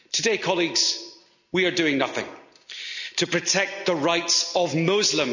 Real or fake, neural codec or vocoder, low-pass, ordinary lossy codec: real; none; 7.2 kHz; none